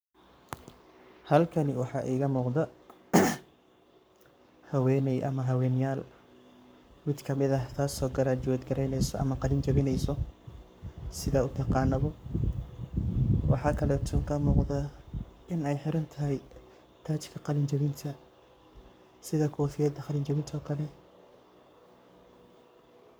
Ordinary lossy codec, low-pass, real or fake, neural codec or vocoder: none; none; fake; codec, 44.1 kHz, 7.8 kbps, Pupu-Codec